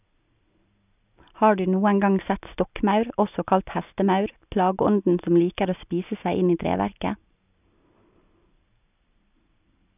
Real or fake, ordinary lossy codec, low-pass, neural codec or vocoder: real; none; 3.6 kHz; none